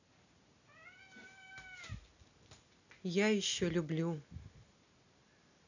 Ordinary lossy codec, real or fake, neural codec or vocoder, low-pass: none; real; none; 7.2 kHz